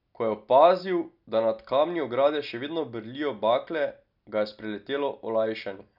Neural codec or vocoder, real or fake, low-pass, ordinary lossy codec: none; real; 5.4 kHz; none